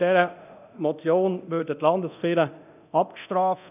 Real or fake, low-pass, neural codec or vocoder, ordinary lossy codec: fake; 3.6 kHz; codec, 24 kHz, 0.9 kbps, DualCodec; none